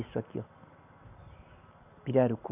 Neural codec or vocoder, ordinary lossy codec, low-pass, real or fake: none; none; 3.6 kHz; real